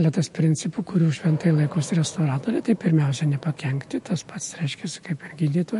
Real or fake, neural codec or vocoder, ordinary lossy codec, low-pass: fake; autoencoder, 48 kHz, 128 numbers a frame, DAC-VAE, trained on Japanese speech; MP3, 48 kbps; 14.4 kHz